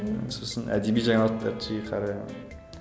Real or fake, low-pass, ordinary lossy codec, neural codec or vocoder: real; none; none; none